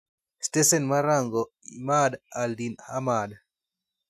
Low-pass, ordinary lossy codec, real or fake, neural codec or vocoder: 14.4 kHz; none; real; none